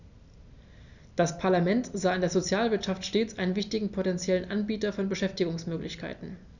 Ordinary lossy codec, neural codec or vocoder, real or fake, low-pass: none; none; real; 7.2 kHz